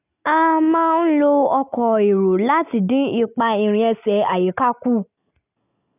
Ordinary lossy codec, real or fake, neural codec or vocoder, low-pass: none; real; none; 3.6 kHz